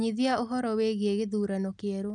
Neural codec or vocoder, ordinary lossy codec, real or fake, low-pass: none; none; real; 10.8 kHz